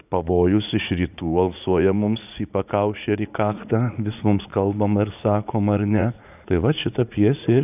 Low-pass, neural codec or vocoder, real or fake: 3.6 kHz; vocoder, 44.1 kHz, 80 mel bands, Vocos; fake